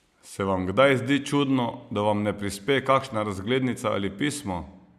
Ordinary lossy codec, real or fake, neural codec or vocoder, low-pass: none; real; none; 14.4 kHz